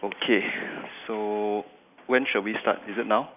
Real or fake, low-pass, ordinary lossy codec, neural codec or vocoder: real; 3.6 kHz; none; none